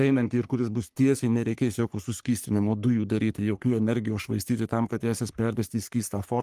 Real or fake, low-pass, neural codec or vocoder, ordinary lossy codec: fake; 14.4 kHz; codec, 44.1 kHz, 3.4 kbps, Pupu-Codec; Opus, 32 kbps